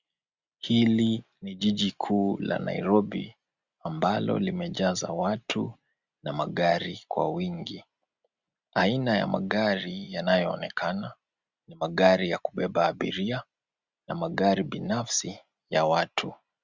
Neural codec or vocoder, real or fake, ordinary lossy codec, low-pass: none; real; Opus, 64 kbps; 7.2 kHz